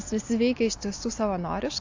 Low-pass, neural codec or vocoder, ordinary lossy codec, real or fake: 7.2 kHz; none; AAC, 48 kbps; real